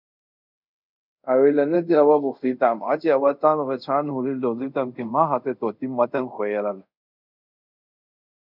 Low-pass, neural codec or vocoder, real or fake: 5.4 kHz; codec, 24 kHz, 0.5 kbps, DualCodec; fake